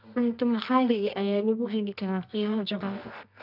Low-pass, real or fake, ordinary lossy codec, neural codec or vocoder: 5.4 kHz; fake; none; codec, 24 kHz, 0.9 kbps, WavTokenizer, medium music audio release